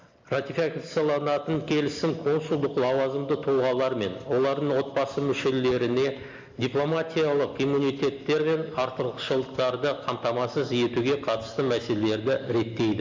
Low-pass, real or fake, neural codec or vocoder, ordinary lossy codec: 7.2 kHz; real; none; MP3, 64 kbps